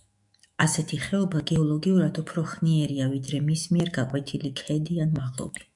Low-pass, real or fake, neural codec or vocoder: 10.8 kHz; fake; autoencoder, 48 kHz, 128 numbers a frame, DAC-VAE, trained on Japanese speech